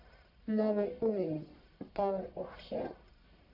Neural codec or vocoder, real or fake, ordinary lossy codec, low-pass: codec, 44.1 kHz, 1.7 kbps, Pupu-Codec; fake; AAC, 48 kbps; 5.4 kHz